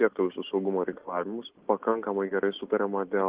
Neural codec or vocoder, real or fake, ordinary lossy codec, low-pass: none; real; Opus, 16 kbps; 3.6 kHz